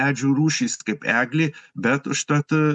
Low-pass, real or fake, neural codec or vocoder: 10.8 kHz; real; none